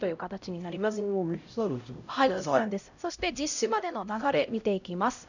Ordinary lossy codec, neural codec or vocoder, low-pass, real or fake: none; codec, 16 kHz, 0.5 kbps, X-Codec, HuBERT features, trained on LibriSpeech; 7.2 kHz; fake